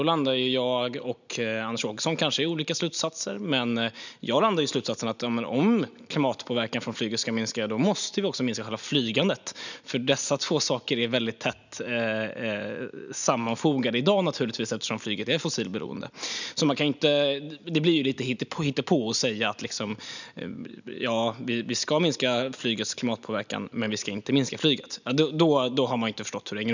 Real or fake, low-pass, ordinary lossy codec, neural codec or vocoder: real; 7.2 kHz; none; none